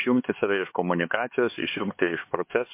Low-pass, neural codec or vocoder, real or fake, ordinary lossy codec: 3.6 kHz; codec, 16 kHz, 4 kbps, X-Codec, HuBERT features, trained on LibriSpeech; fake; MP3, 24 kbps